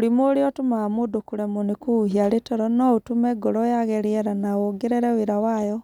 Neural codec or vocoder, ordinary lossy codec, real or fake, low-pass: none; none; real; 19.8 kHz